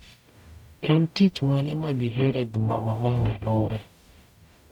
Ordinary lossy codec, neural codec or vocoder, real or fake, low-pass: none; codec, 44.1 kHz, 0.9 kbps, DAC; fake; 19.8 kHz